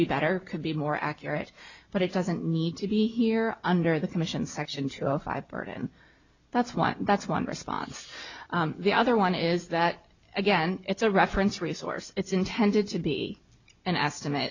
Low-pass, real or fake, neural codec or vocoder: 7.2 kHz; real; none